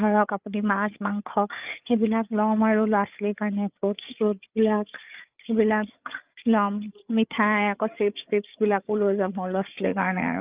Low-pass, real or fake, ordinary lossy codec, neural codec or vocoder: 3.6 kHz; fake; Opus, 24 kbps; codec, 16 kHz, 8 kbps, FreqCodec, larger model